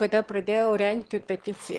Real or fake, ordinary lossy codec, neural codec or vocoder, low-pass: fake; Opus, 16 kbps; autoencoder, 22.05 kHz, a latent of 192 numbers a frame, VITS, trained on one speaker; 9.9 kHz